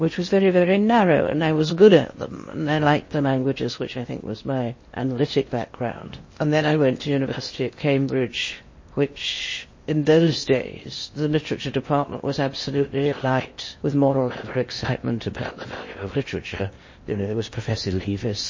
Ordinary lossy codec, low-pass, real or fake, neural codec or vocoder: MP3, 32 kbps; 7.2 kHz; fake; codec, 16 kHz in and 24 kHz out, 0.8 kbps, FocalCodec, streaming, 65536 codes